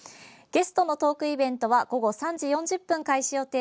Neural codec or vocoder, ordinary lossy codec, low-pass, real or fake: none; none; none; real